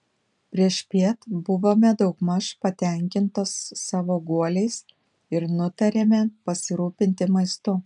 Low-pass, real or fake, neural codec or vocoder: 10.8 kHz; real; none